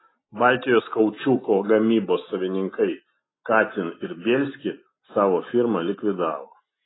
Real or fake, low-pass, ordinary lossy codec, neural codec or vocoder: real; 7.2 kHz; AAC, 16 kbps; none